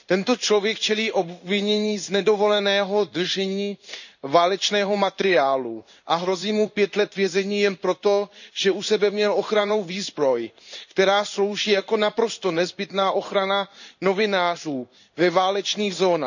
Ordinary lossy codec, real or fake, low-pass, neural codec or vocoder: none; fake; 7.2 kHz; codec, 16 kHz in and 24 kHz out, 1 kbps, XY-Tokenizer